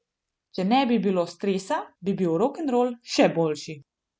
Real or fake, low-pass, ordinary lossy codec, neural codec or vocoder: real; none; none; none